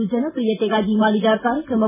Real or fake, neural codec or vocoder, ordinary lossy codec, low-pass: real; none; none; 3.6 kHz